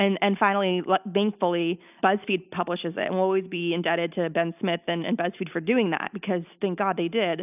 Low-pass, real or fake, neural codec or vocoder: 3.6 kHz; real; none